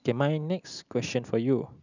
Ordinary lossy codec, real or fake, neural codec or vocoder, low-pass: none; real; none; 7.2 kHz